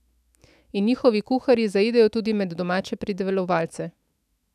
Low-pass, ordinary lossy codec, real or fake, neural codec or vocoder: 14.4 kHz; none; fake; autoencoder, 48 kHz, 128 numbers a frame, DAC-VAE, trained on Japanese speech